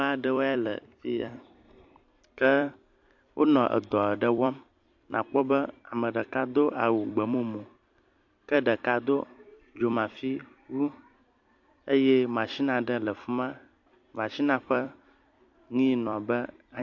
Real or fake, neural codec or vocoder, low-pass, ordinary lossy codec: fake; vocoder, 44.1 kHz, 128 mel bands every 256 samples, BigVGAN v2; 7.2 kHz; MP3, 48 kbps